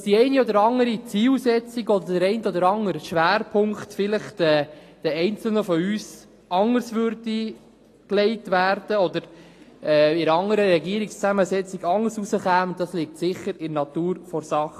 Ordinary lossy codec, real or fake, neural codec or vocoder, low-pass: AAC, 48 kbps; real; none; 14.4 kHz